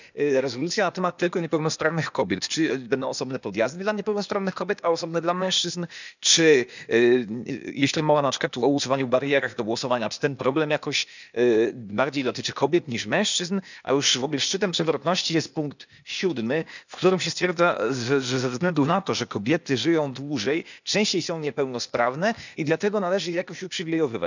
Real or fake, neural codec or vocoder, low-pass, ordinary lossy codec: fake; codec, 16 kHz, 0.8 kbps, ZipCodec; 7.2 kHz; none